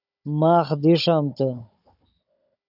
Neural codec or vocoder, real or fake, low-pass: codec, 16 kHz, 16 kbps, FunCodec, trained on Chinese and English, 50 frames a second; fake; 5.4 kHz